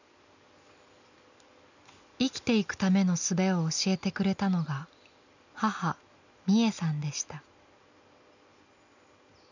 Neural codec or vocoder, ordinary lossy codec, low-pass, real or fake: none; none; 7.2 kHz; real